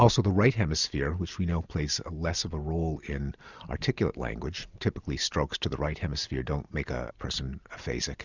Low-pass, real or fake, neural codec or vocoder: 7.2 kHz; real; none